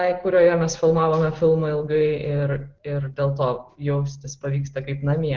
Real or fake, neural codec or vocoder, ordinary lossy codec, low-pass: real; none; Opus, 16 kbps; 7.2 kHz